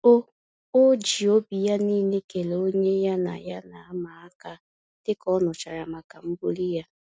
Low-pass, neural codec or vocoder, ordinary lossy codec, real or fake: none; none; none; real